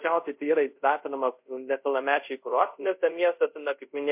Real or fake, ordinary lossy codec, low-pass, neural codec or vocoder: fake; MP3, 32 kbps; 3.6 kHz; codec, 24 kHz, 0.5 kbps, DualCodec